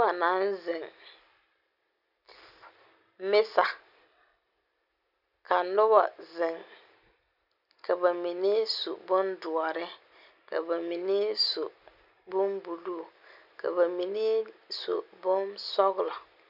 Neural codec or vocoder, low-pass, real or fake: none; 5.4 kHz; real